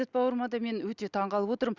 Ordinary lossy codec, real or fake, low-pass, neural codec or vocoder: Opus, 64 kbps; real; 7.2 kHz; none